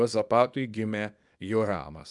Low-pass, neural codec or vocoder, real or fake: 10.8 kHz; codec, 24 kHz, 0.9 kbps, WavTokenizer, small release; fake